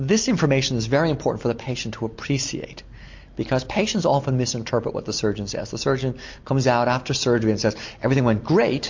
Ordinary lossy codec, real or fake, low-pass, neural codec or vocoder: MP3, 48 kbps; real; 7.2 kHz; none